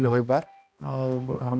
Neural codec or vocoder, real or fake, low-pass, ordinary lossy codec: codec, 16 kHz, 1 kbps, X-Codec, HuBERT features, trained on balanced general audio; fake; none; none